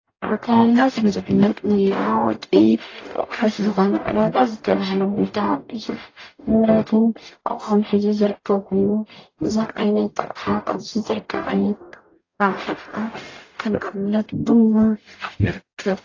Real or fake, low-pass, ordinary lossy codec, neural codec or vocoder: fake; 7.2 kHz; AAC, 32 kbps; codec, 44.1 kHz, 0.9 kbps, DAC